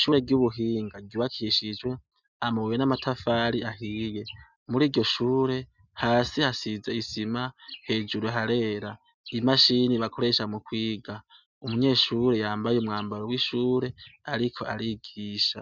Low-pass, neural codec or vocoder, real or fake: 7.2 kHz; none; real